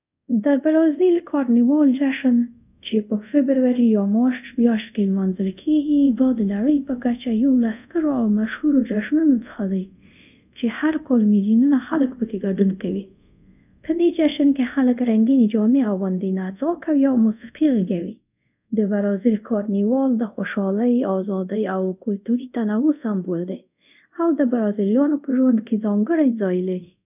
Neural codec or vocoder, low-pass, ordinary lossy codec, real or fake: codec, 24 kHz, 0.5 kbps, DualCodec; 3.6 kHz; none; fake